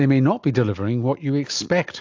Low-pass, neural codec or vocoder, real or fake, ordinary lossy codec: 7.2 kHz; none; real; AAC, 48 kbps